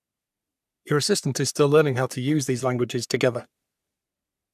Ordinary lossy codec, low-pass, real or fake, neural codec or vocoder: none; 14.4 kHz; fake; codec, 44.1 kHz, 3.4 kbps, Pupu-Codec